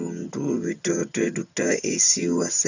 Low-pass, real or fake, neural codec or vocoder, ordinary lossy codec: 7.2 kHz; fake; vocoder, 22.05 kHz, 80 mel bands, HiFi-GAN; none